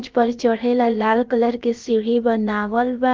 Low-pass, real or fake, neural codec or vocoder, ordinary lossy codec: 7.2 kHz; fake; codec, 16 kHz, about 1 kbps, DyCAST, with the encoder's durations; Opus, 32 kbps